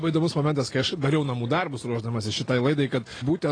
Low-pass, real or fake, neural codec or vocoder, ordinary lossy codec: 9.9 kHz; real; none; AAC, 32 kbps